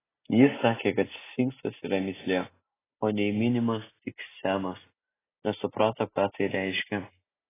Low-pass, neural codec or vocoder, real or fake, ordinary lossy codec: 3.6 kHz; none; real; AAC, 16 kbps